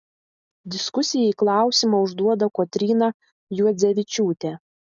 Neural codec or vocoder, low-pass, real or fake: none; 7.2 kHz; real